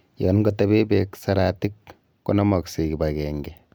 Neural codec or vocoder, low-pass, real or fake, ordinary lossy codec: none; none; real; none